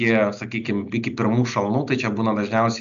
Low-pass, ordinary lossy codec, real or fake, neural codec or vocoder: 7.2 kHz; MP3, 96 kbps; real; none